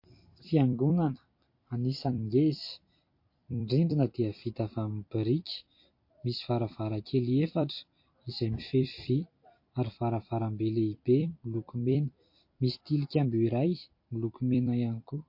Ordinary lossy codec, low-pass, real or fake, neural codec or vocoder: MP3, 32 kbps; 5.4 kHz; fake; vocoder, 44.1 kHz, 128 mel bands every 256 samples, BigVGAN v2